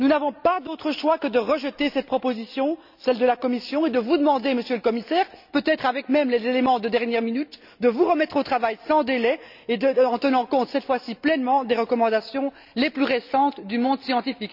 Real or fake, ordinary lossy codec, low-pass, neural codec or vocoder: real; none; 5.4 kHz; none